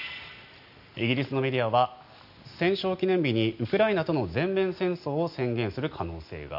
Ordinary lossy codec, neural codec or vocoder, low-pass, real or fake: none; none; 5.4 kHz; real